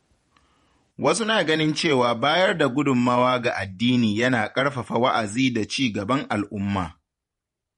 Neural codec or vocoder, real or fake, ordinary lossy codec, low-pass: vocoder, 48 kHz, 128 mel bands, Vocos; fake; MP3, 48 kbps; 19.8 kHz